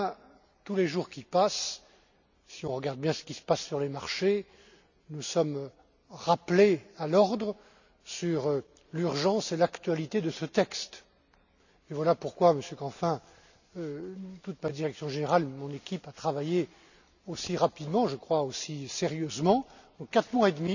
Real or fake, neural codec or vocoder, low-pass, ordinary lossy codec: real; none; 7.2 kHz; none